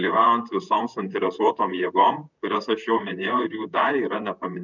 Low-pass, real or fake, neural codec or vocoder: 7.2 kHz; fake; vocoder, 44.1 kHz, 128 mel bands, Pupu-Vocoder